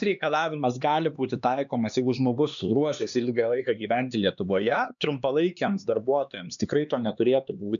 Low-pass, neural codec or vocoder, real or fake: 7.2 kHz; codec, 16 kHz, 2 kbps, X-Codec, HuBERT features, trained on LibriSpeech; fake